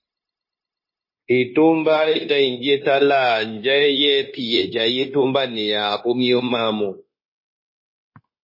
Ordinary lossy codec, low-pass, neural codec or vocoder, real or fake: MP3, 24 kbps; 5.4 kHz; codec, 16 kHz, 0.9 kbps, LongCat-Audio-Codec; fake